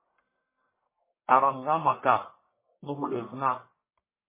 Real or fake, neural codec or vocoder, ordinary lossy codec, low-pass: fake; codec, 44.1 kHz, 1.7 kbps, Pupu-Codec; MP3, 16 kbps; 3.6 kHz